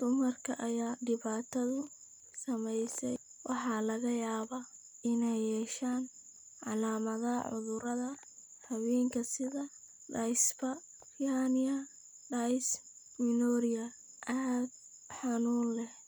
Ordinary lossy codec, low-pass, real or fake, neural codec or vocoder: none; none; real; none